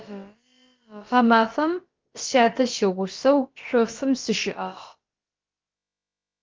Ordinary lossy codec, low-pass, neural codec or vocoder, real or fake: Opus, 32 kbps; 7.2 kHz; codec, 16 kHz, about 1 kbps, DyCAST, with the encoder's durations; fake